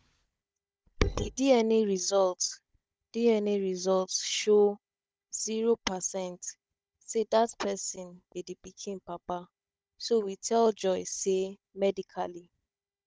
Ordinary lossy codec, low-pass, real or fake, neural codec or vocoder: none; none; fake; codec, 16 kHz, 16 kbps, FunCodec, trained on Chinese and English, 50 frames a second